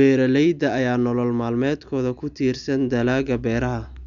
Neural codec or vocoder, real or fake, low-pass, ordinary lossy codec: none; real; 7.2 kHz; none